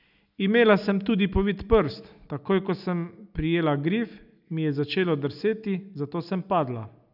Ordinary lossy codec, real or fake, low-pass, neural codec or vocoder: none; real; 5.4 kHz; none